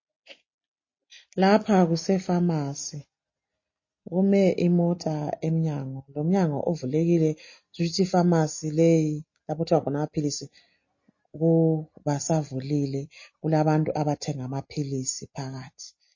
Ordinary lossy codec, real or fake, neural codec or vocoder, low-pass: MP3, 32 kbps; real; none; 7.2 kHz